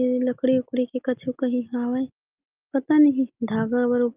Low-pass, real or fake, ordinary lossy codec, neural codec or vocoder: 3.6 kHz; real; Opus, 24 kbps; none